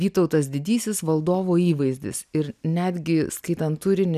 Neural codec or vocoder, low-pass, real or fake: none; 14.4 kHz; real